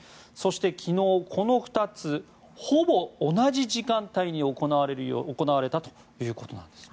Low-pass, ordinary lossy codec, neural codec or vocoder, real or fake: none; none; none; real